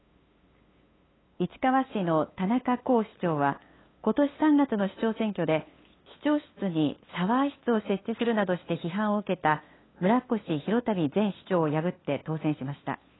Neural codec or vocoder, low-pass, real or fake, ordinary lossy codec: codec, 16 kHz, 8 kbps, FunCodec, trained on LibriTTS, 25 frames a second; 7.2 kHz; fake; AAC, 16 kbps